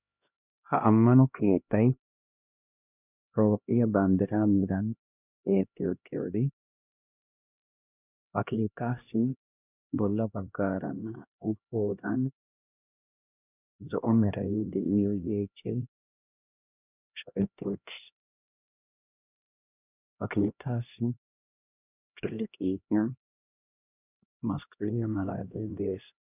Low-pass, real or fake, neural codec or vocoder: 3.6 kHz; fake; codec, 16 kHz, 1 kbps, X-Codec, HuBERT features, trained on LibriSpeech